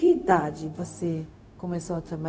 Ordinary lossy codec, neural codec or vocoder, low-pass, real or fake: none; codec, 16 kHz, 0.4 kbps, LongCat-Audio-Codec; none; fake